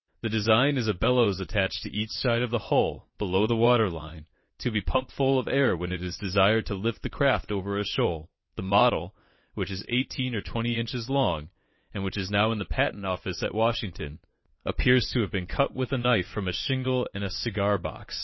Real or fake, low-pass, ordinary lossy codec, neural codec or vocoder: fake; 7.2 kHz; MP3, 24 kbps; vocoder, 22.05 kHz, 80 mel bands, WaveNeXt